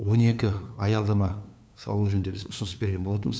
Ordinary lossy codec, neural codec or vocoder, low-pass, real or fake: none; codec, 16 kHz, 2 kbps, FunCodec, trained on LibriTTS, 25 frames a second; none; fake